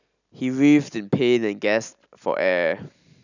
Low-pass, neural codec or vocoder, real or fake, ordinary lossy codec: 7.2 kHz; none; real; none